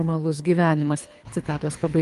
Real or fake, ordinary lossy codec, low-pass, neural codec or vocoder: fake; Opus, 32 kbps; 10.8 kHz; codec, 24 kHz, 3 kbps, HILCodec